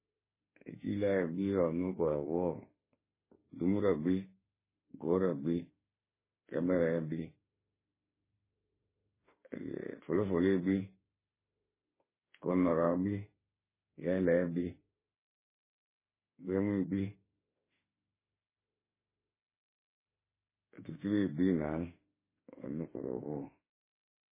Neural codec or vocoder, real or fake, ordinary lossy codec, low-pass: autoencoder, 48 kHz, 32 numbers a frame, DAC-VAE, trained on Japanese speech; fake; MP3, 16 kbps; 3.6 kHz